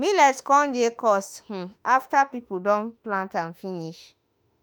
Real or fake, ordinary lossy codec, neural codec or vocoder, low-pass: fake; none; autoencoder, 48 kHz, 32 numbers a frame, DAC-VAE, trained on Japanese speech; none